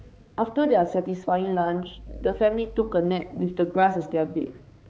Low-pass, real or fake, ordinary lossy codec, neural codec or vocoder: none; fake; none; codec, 16 kHz, 4 kbps, X-Codec, HuBERT features, trained on balanced general audio